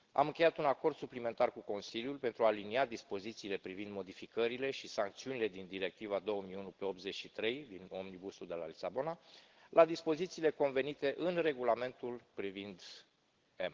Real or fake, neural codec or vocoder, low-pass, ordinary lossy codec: real; none; 7.2 kHz; Opus, 16 kbps